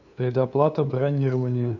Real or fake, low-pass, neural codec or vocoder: fake; 7.2 kHz; codec, 16 kHz, 2 kbps, FunCodec, trained on LibriTTS, 25 frames a second